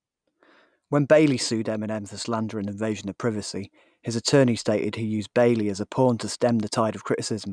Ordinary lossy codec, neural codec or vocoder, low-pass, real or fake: none; none; 9.9 kHz; real